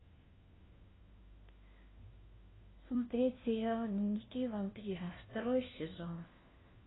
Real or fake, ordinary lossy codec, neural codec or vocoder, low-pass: fake; AAC, 16 kbps; codec, 16 kHz, 1 kbps, FunCodec, trained on LibriTTS, 50 frames a second; 7.2 kHz